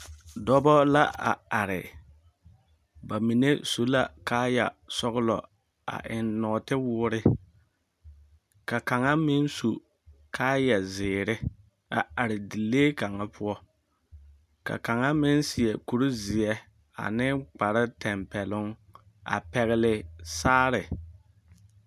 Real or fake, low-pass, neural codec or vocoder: real; 14.4 kHz; none